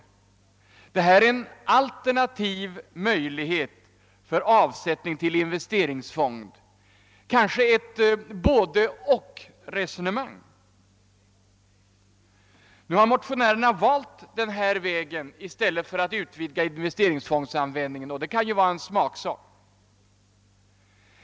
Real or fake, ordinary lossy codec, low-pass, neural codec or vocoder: real; none; none; none